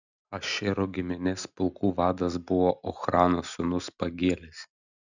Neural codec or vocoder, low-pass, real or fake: none; 7.2 kHz; real